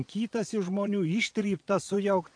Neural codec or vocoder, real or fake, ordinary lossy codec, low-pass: vocoder, 22.05 kHz, 80 mel bands, Vocos; fake; AAC, 64 kbps; 9.9 kHz